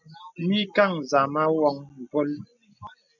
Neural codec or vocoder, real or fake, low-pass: none; real; 7.2 kHz